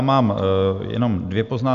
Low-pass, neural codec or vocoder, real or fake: 7.2 kHz; none; real